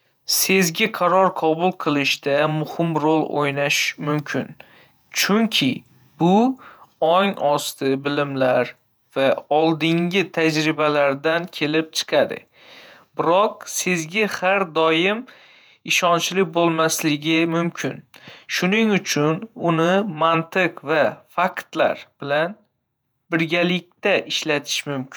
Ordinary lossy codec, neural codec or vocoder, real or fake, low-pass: none; vocoder, 48 kHz, 128 mel bands, Vocos; fake; none